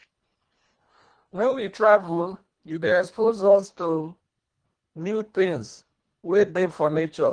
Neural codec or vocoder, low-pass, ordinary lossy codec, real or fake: codec, 24 kHz, 1.5 kbps, HILCodec; 9.9 kHz; Opus, 24 kbps; fake